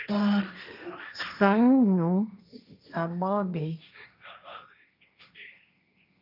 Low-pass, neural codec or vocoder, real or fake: 5.4 kHz; codec, 16 kHz, 1.1 kbps, Voila-Tokenizer; fake